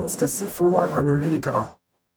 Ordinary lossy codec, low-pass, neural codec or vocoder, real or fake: none; none; codec, 44.1 kHz, 0.9 kbps, DAC; fake